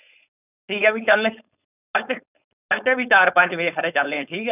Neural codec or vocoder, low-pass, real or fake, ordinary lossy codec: codec, 16 kHz, 4.8 kbps, FACodec; 3.6 kHz; fake; none